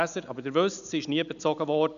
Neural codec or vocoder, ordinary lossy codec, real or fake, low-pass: none; MP3, 96 kbps; real; 7.2 kHz